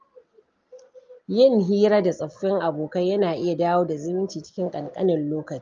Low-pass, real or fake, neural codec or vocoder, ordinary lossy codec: 7.2 kHz; real; none; Opus, 24 kbps